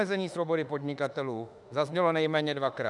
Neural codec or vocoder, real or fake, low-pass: autoencoder, 48 kHz, 32 numbers a frame, DAC-VAE, trained on Japanese speech; fake; 10.8 kHz